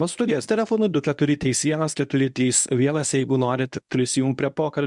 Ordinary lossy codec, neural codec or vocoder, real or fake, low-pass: MP3, 96 kbps; codec, 24 kHz, 0.9 kbps, WavTokenizer, medium speech release version 1; fake; 10.8 kHz